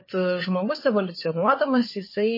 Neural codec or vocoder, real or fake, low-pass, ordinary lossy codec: codec, 16 kHz, 4 kbps, FunCodec, trained on LibriTTS, 50 frames a second; fake; 5.4 kHz; MP3, 24 kbps